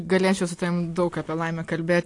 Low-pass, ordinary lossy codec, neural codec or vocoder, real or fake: 10.8 kHz; AAC, 48 kbps; none; real